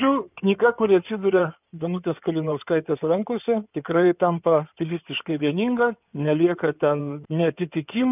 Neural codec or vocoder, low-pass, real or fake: codec, 16 kHz in and 24 kHz out, 2.2 kbps, FireRedTTS-2 codec; 3.6 kHz; fake